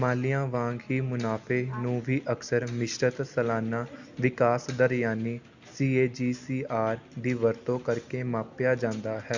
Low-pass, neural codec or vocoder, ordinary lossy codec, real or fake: 7.2 kHz; none; Opus, 64 kbps; real